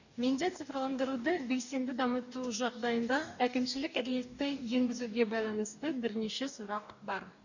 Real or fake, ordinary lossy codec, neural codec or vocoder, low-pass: fake; none; codec, 44.1 kHz, 2.6 kbps, DAC; 7.2 kHz